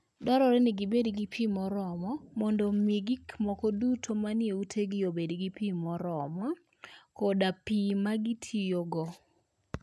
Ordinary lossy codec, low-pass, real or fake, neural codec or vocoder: none; none; real; none